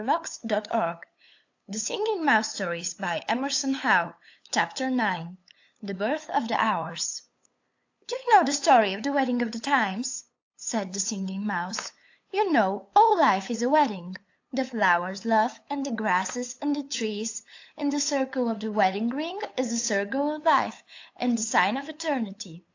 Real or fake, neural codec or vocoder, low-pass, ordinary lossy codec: fake; codec, 16 kHz, 8 kbps, FunCodec, trained on LibriTTS, 25 frames a second; 7.2 kHz; AAC, 48 kbps